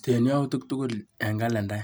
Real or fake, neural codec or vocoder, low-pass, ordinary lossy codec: real; none; none; none